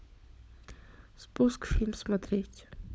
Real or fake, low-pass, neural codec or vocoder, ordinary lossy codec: fake; none; codec, 16 kHz, 16 kbps, FunCodec, trained on LibriTTS, 50 frames a second; none